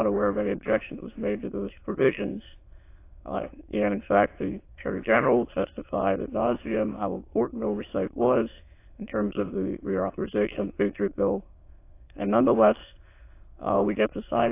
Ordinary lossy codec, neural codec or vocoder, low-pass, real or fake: AAC, 24 kbps; autoencoder, 22.05 kHz, a latent of 192 numbers a frame, VITS, trained on many speakers; 3.6 kHz; fake